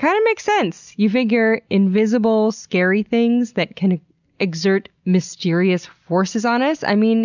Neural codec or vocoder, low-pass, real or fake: none; 7.2 kHz; real